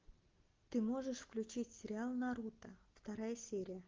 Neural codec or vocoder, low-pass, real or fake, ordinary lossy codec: none; 7.2 kHz; real; Opus, 32 kbps